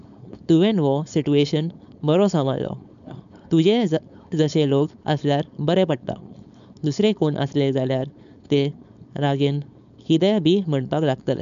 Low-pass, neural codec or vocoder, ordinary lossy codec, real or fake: 7.2 kHz; codec, 16 kHz, 4.8 kbps, FACodec; none; fake